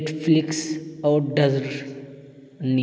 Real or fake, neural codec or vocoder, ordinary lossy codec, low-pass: real; none; none; none